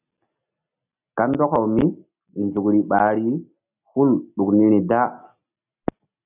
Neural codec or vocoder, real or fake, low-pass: none; real; 3.6 kHz